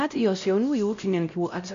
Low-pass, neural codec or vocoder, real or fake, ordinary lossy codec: 7.2 kHz; codec, 16 kHz, 0.5 kbps, X-Codec, WavLM features, trained on Multilingual LibriSpeech; fake; AAC, 64 kbps